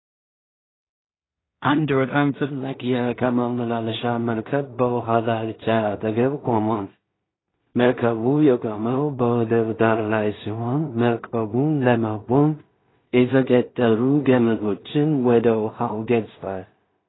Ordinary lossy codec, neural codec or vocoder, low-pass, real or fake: AAC, 16 kbps; codec, 16 kHz in and 24 kHz out, 0.4 kbps, LongCat-Audio-Codec, two codebook decoder; 7.2 kHz; fake